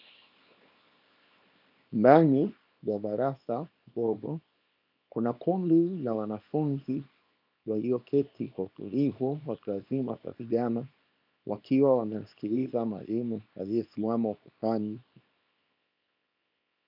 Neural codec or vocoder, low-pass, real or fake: codec, 24 kHz, 0.9 kbps, WavTokenizer, small release; 5.4 kHz; fake